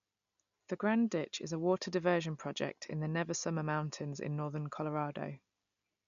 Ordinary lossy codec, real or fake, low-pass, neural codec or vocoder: none; real; 7.2 kHz; none